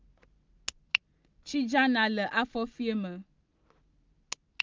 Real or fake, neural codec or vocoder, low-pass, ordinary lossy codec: real; none; 7.2 kHz; Opus, 24 kbps